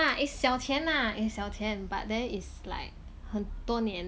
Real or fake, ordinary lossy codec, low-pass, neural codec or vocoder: real; none; none; none